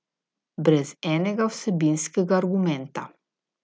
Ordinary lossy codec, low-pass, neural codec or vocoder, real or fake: none; none; none; real